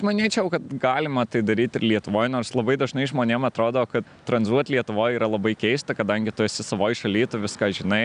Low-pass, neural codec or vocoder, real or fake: 9.9 kHz; none; real